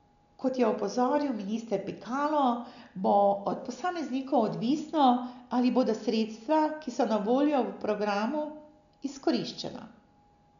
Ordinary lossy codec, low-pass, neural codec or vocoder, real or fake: none; 7.2 kHz; none; real